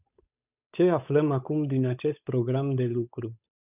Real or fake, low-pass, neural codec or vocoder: fake; 3.6 kHz; codec, 16 kHz, 8 kbps, FunCodec, trained on Chinese and English, 25 frames a second